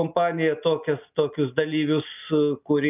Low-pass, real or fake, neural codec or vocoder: 3.6 kHz; real; none